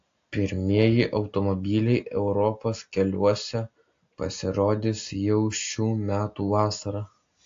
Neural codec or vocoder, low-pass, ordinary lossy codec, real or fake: none; 7.2 kHz; AAC, 48 kbps; real